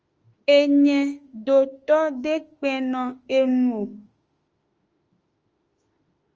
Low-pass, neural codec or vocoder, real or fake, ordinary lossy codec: 7.2 kHz; autoencoder, 48 kHz, 32 numbers a frame, DAC-VAE, trained on Japanese speech; fake; Opus, 24 kbps